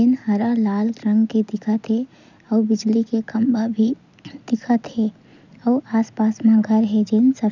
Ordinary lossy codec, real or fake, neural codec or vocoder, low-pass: none; real; none; 7.2 kHz